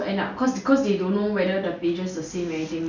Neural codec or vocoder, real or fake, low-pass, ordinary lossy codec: none; real; 7.2 kHz; none